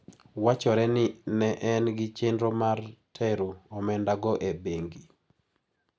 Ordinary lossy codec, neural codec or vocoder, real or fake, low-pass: none; none; real; none